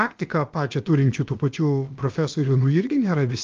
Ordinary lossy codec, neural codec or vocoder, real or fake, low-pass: Opus, 32 kbps; codec, 16 kHz, about 1 kbps, DyCAST, with the encoder's durations; fake; 7.2 kHz